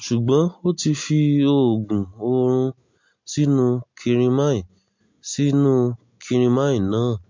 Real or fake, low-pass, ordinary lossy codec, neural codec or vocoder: real; 7.2 kHz; MP3, 48 kbps; none